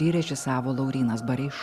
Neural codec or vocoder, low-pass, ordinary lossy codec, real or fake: none; 14.4 kHz; Opus, 64 kbps; real